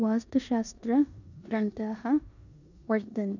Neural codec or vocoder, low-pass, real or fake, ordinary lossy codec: codec, 16 kHz in and 24 kHz out, 0.9 kbps, LongCat-Audio-Codec, four codebook decoder; 7.2 kHz; fake; none